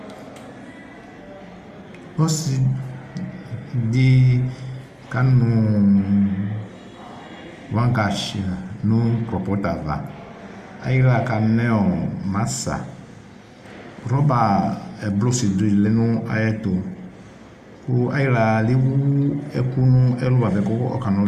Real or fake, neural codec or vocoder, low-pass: real; none; 14.4 kHz